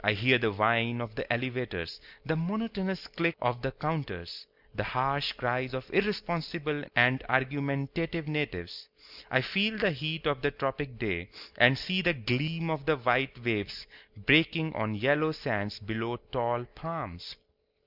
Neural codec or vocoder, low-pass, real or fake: none; 5.4 kHz; real